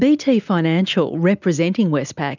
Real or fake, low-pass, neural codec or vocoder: real; 7.2 kHz; none